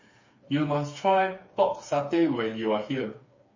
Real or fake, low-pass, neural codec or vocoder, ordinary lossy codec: fake; 7.2 kHz; codec, 16 kHz, 4 kbps, FreqCodec, smaller model; MP3, 32 kbps